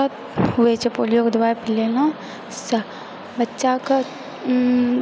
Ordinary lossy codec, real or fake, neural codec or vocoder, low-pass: none; real; none; none